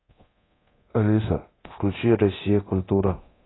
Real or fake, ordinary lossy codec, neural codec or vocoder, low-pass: fake; AAC, 16 kbps; codec, 24 kHz, 0.9 kbps, DualCodec; 7.2 kHz